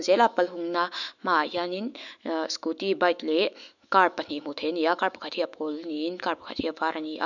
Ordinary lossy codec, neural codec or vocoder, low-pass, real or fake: none; autoencoder, 48 kHz, 128 numbers a frame, DAC-VAE, trained on Japanese speech; 7.2 kHz; fake